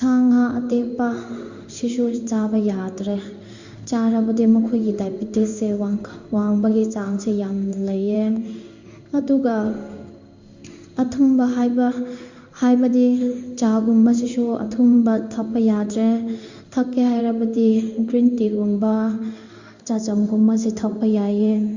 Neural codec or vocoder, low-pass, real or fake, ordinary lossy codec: codec, 16 kHz in and 24 kHz out, 1 kbps, XY-Tokenizer; 7.2 kHz; fake; Opus, 64 kbps